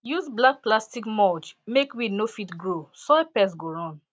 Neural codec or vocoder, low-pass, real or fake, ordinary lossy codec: none; none; real; none